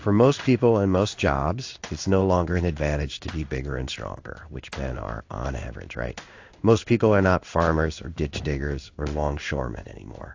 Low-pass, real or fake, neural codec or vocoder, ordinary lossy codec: 7.2 kHz; fake; codec, 16 kHz in and 24 kHz out, 1 kbps, XY-Tokenizer; AAC, 48 kbps